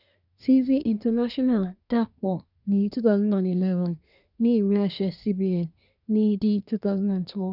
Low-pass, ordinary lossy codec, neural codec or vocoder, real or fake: 5.4 kHz; none; codec, 24 kHz, 1 kbps, SNAC; fake